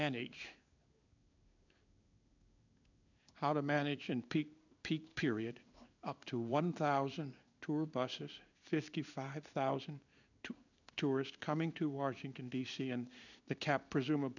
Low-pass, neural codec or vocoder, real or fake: 7.2 kHz; codec, 16 kHz in and 24 kHz out, 1 kbps, XY-Tokenizer; fake